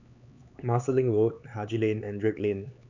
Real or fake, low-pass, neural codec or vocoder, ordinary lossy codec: fake; 7.2 kHz; codec, 16 kHz, 4 kbps, X-Codec, HuBERT features, trained on LibriSpeech; none